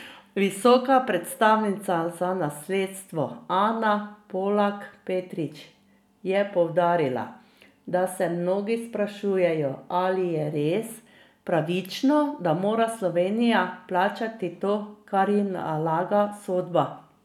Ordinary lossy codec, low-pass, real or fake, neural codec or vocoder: none; 19.8 kHz; real; none